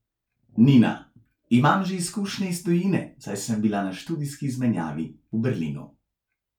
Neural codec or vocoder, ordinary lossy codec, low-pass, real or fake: none; none; 19.8 kHz; real